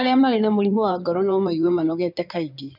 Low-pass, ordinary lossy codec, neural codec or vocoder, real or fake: 5.4 kHz; none; codec, 16 kHz in and 24 kHz out, 2.2 kbps, FireRedTTS-2 codec; fake